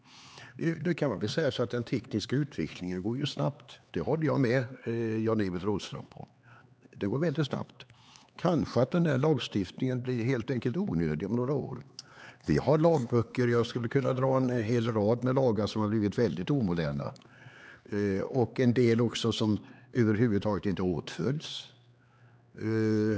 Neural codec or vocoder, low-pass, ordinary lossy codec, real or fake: codec, 16 kHz, 4 kbps, X-Codec, HuBERT features, trained on LibriSpeech; none; none; fake